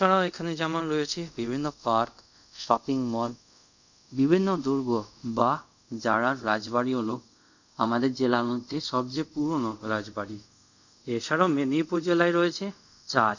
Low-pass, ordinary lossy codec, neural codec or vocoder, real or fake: 7.2 kHz; none; codec, 24 kHz, 0.5 kbps, DualCodec; fake